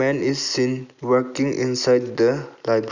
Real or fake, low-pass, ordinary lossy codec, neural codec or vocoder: real; 7.2 kHz; none; none